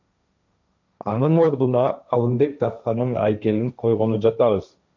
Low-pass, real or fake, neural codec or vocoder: 7.2 kHz; fake; codec, 16 kHz, 1.1 kbps, Voila-Tokenizer